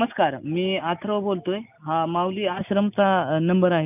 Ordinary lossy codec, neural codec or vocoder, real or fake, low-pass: none; none; real; 3.6 kHz